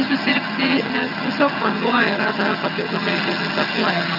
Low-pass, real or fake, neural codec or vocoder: 5.4 kHz; fake; vocoder, 22.05 kHz, 80 mel bands, HiFi-GAN